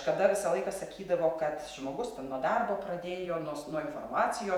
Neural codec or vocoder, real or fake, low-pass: vocoder, 48 kHz, 128 mel bands, Vocos; fake; 19.8 kHz